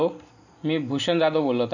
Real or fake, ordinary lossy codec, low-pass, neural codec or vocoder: real; none; 7.2 kHz; none